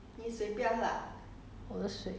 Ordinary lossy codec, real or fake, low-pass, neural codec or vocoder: none; real; none; none